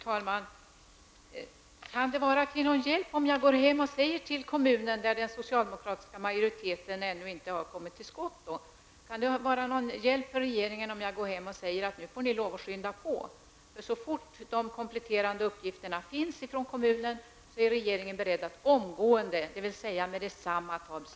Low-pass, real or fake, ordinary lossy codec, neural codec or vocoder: none; real; none; none